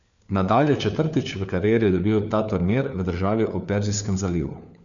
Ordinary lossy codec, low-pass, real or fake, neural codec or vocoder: none; 7.2 kHz; fake; codec, 16 kHz, 4 kbps, FunCodec, trained on LibriTTS, 50 frames a second